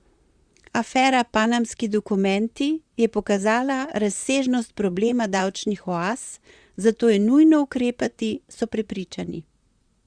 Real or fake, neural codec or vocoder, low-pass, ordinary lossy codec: fake; vocoder, 44.1 kHz, 128 mel bands, Pupu-Vocoder; 9.9 kHz; Opus, 64 kbps